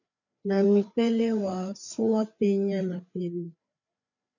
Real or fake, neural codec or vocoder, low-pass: fake; codec, 16 kHz, 4 kbps, FreqCodec, larger model; 7.2 kHz